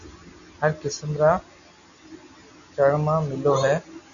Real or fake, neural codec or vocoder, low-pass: real; none; 7.2 kHz